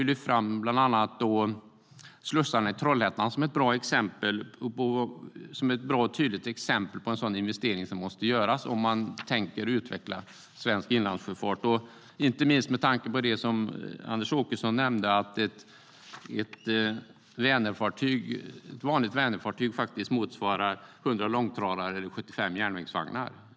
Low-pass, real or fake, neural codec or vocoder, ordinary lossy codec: none; real; none; none